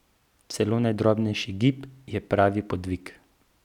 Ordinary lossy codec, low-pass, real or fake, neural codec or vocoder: none; 19.8 kHz; real; none